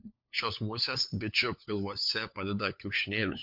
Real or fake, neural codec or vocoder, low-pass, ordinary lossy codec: fake; codec, 16 kHz, 4 kbps, FunCodec, trained on LibriTTS, 50 frames a second; 5.4 kHz; MP3, 48 kbps